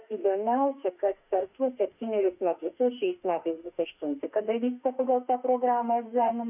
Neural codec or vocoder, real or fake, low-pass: autoencoder, 48 kHz, 32 numbers a frame, DAC-VAE, trained on Japanese speech; fake; 3.6 kHz